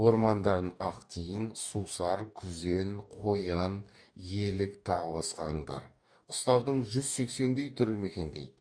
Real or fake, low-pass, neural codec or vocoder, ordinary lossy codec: fake; 9.9 kHz; codec, 44.1 kHz, 2.6 kbps, DAC; none